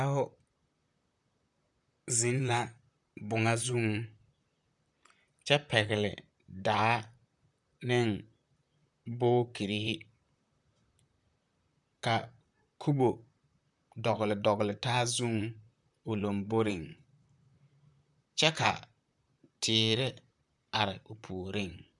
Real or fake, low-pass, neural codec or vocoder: fake; 10.8 kHz; vocoder, 44.1 kHz, 128 mel bands, Pupu-Vocoder